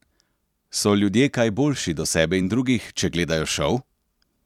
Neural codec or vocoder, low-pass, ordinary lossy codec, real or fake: vocoder, 44.1 kHz, 128 mel bands every 256 samples, BigVGAN v2; 19.8 kHz; none; fake